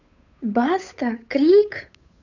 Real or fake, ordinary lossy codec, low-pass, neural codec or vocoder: fake; none; 7.2 kHz; codec, 16 kHz, 8 kbps, FunCodec, trained on Chinese and English, 25 frames a second